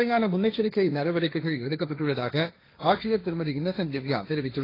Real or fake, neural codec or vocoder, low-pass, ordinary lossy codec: fake; codec, 16 kHz, 1.1 kbps, Voila-Tokenizer; 5.4 kHz; AAC, 24 kbps